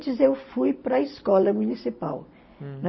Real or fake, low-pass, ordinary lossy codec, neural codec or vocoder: real; 7.2 kHz; MP3, 24 kbps; none